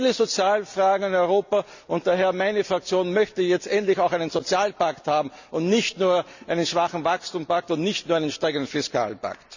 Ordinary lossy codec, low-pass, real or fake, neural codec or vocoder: none; 7.2 kHz; real; none